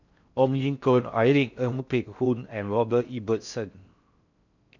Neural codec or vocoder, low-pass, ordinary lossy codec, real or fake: codec, 16 kHz in and 24 kHz out, 0.6 kbps, FocalCodec, streaming, 4096 codes; 7.2 kHz; none; fake